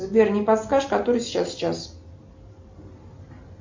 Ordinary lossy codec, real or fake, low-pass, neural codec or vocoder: MP3, 48 kbps; real; 7.2 kHz; none